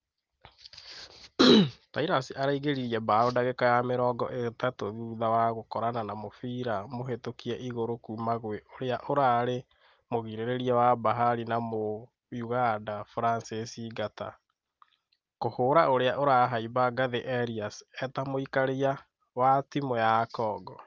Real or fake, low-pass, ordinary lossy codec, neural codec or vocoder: real; 7.2 kHz; Opus, 32 kbps; none